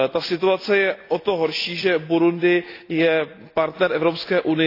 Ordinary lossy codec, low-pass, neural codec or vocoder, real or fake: AAC, 32 kbps; 5.4 kHz; none; real